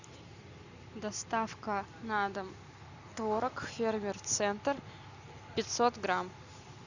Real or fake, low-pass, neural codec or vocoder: real; 7.2 kHz; none